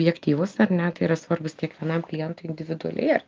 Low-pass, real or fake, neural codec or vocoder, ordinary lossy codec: 7.2 kHz; real; none; Opus, 32 kbps